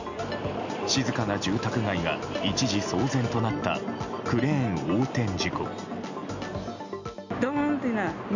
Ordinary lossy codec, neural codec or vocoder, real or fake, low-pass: none; none; real; 7.2 kHz